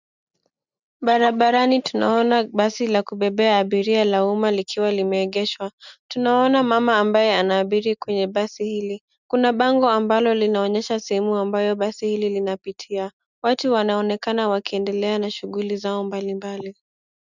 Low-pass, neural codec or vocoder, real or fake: 7.2 kHz; none; real